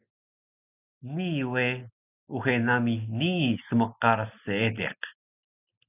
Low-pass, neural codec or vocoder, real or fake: 3.6 kHz; none; real